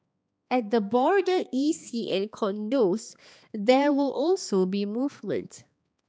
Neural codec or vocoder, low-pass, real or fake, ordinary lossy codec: codec, 16 kHz, 2 kbps, X-Codec, HuBERT features, trained on balanced general audio; none; fake; none